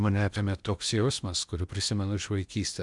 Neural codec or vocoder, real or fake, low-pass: codec, 16 kHz in and 24 kHz out, 0.8 kbps, FocalCodec, streaming, 65536 codes; fake; 10.8 kHz